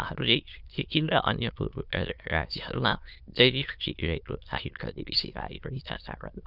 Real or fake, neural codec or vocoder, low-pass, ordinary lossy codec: fake; autoencoder, 22.05 kHz, a latent of 192 numbers a frame, VITS, trained on many speakers; 5.4 kHz; none